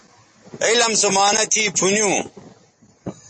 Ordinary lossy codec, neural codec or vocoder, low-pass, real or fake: MP3, 32 kbps; none; 9.9 kHz; real